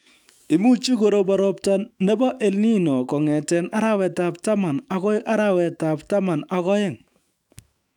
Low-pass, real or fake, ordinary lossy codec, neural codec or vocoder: 19.8 kHz; fake; none; autoencoder, 48 kHz, 128 numbers a frame, DAC-VAE, trained on Japanese speech